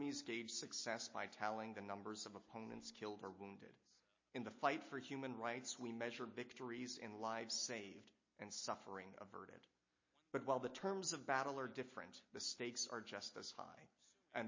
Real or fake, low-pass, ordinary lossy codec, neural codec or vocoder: real; 7.2 kHz; MP3, 32 kbps; none